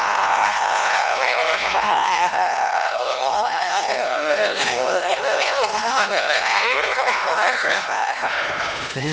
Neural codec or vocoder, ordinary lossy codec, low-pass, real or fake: codec, 16 kHz, 1 kbps, X-Codec, HuBERT features, trained on LibriSpeech; none; none; fake